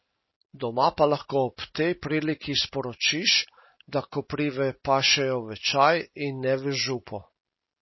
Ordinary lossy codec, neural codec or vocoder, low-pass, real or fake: MP3, 24 kbps; none; 7.2 kHz; real